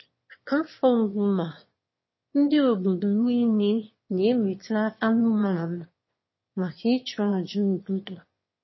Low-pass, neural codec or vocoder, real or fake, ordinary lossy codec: 7.2 kHz; autoencoder, 22.05 kHz, a latent of 192 numbers a frame, VITS, trained on one speaker; fake; MP3, 24 kbps